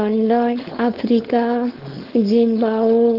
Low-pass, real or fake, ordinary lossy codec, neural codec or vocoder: 5.4 kHz; fake; Opus, 16 kbps; codec, 16 kHz, 4.8 kbps, FACodec